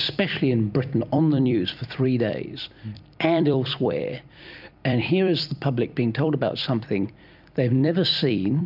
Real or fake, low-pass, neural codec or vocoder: fake; 5.4 kHz; vocoder, 44.1 kHz, 128 mel bands every 256 samples, BigVGAN v2